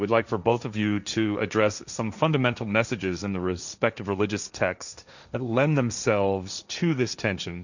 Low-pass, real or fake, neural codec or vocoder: 7.2 kHz; fake; codec, 16 kHz, 1.1 kbps, Voila-Tokenizer